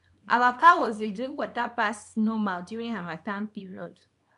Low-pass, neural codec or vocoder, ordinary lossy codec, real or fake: 10.8 kHz; codec, 24 kHz, 0.9 kbps, WavTokenizer, small release; none; fake